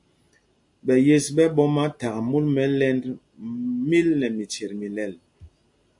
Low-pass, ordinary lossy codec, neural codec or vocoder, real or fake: 10.8 kHz; AAC, 64 kbps; none; real